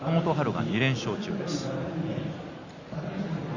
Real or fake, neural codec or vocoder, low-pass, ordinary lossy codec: real; none; 7.2 kHz; Opus, 64 kbps